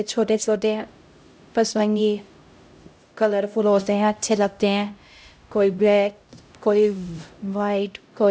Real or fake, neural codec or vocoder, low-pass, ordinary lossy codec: fake; codec, 16 kHz, 0.5 kbps, X-Codec, HuBERT features, trained on LibriSpeech; none; none